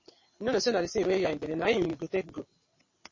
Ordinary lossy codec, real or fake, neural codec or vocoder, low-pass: MP3, 32 kbps; fake; vocoder, 22.05 kHz, 80 mel bands, WaveNeXt; 7.2 kHz